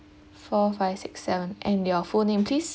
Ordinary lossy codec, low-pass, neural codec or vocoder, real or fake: none; none; none; real